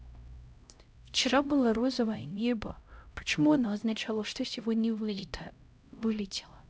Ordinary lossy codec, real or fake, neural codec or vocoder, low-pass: none; fake; codec, 16 kHz, 0.5 kbps, X-Codec, HuBERT features, trained on LibriSpeech; none